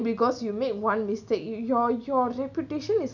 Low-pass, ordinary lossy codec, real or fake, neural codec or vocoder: 7.2 kHz; none; real; none